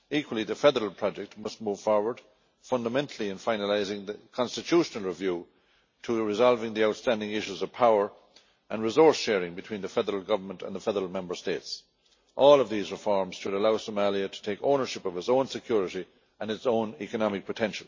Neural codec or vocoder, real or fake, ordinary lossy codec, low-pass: none; real; MP3, 32 kbps; 7.2 kHz